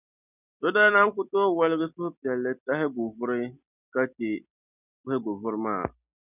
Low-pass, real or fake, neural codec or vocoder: 3.6 kHz; real; none